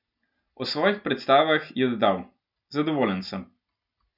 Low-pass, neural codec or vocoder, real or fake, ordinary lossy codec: 5.4 kHz; none; real; none